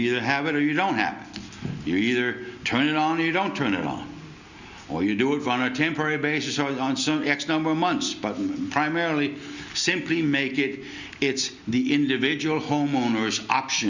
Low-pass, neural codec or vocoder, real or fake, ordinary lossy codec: 7.2 kHz; none; real; Opus, 64 kbps